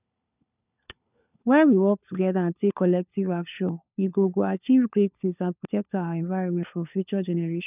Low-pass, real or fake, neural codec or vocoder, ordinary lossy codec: 3.6 kHz; fake; codec, 16 kHz, 4 kbps, FunCodec, trained on LibriTTS, 50 frames a second; none